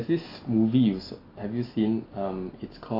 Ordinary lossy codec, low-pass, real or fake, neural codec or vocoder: AAC, 32 kbps; 5.4 kHz; real; none